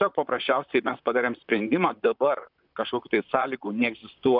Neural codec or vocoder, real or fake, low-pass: vocoder, 44.1 kHz, 128 mel bands, Pupu-Vocoder; fake; 5.4 kHz